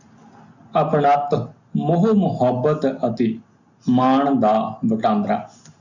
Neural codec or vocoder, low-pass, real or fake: none; 7.2 kHz; real